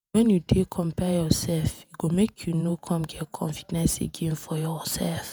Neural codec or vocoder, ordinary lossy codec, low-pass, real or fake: vocoder, 48 kHz, 128 mel bands, Vocos; none; none; fake